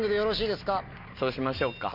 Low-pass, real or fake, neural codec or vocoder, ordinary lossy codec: 5.4 kHz; real; none; none